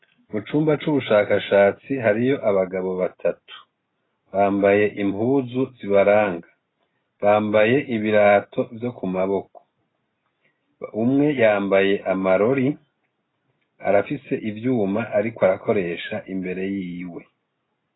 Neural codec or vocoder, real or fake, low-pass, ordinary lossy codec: none; real; 7.2 kHz; AAC, 16 kbps